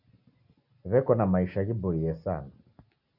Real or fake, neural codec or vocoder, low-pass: real; none; 5.4 kHz